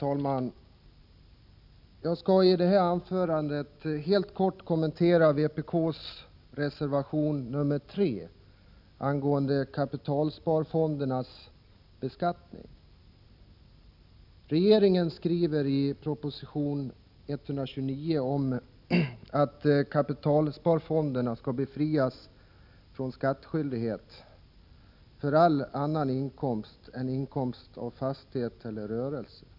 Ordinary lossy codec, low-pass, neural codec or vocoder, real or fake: none; 5.4 kHz; none; real